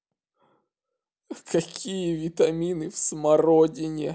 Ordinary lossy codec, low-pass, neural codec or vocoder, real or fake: none; none; none; real